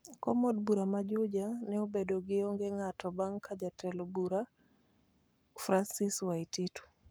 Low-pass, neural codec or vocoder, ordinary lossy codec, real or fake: none; codec, 44.1 kHz, 7.8 kbps, Pupu-Codec; none; fake